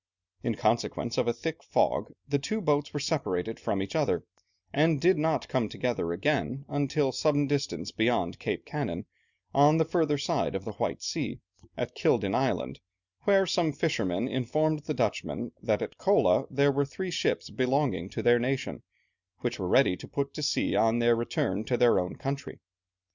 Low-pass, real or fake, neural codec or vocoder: 7.2 kHz; real; none